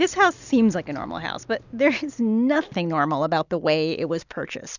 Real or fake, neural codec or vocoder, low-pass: real; none; 7.2 kHz